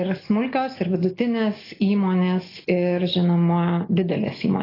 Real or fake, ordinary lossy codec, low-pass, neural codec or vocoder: real; AAC, 24 kbps; 5.4 kHz; none